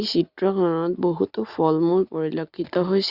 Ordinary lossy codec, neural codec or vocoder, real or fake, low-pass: Opus, 64 kbps; none; real; 5.4 kHz